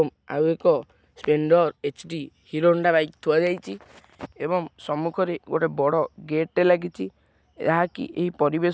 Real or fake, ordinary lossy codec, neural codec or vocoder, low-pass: real; none; none; none